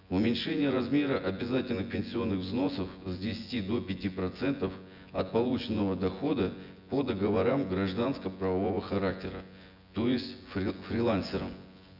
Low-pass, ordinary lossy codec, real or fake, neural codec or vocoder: 5.4 kHz; none; fake; vocoder, 24 kHz, 100 mel bands, Vocos